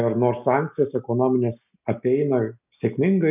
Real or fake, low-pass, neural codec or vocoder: real; 3.6 kHz; none